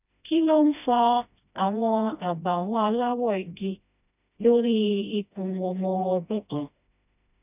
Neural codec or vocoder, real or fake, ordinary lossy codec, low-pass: codec, 16 kHz, 1 kbps, FreqCodec, smaller model; fake; none; 3.6 kHz